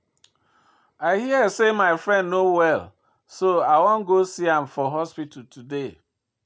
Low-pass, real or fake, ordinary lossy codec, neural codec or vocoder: none; real; none; none